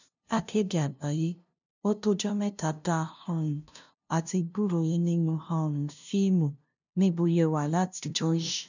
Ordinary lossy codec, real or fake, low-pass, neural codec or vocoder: none; fake; 7.2 kHz; codec, 16 kHz, 0.5 kbps, FunCodec, trained on LibriTTS, 25 frames a second